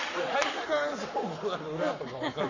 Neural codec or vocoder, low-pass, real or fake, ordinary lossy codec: codec, 44.1 kHz, 7.8 kbps, Pupu-Codec; 7.2 kHz; fake; none